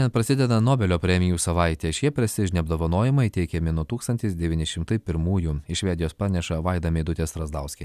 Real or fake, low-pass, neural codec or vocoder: real; 14.4 kHz; none